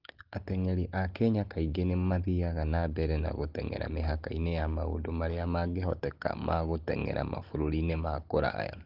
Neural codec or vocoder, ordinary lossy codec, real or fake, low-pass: none; Opus, 16 kbps; real; 5.4 kHz